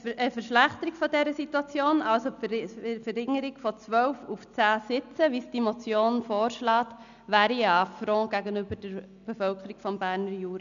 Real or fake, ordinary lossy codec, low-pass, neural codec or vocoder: real; none; 7.2 kHz; none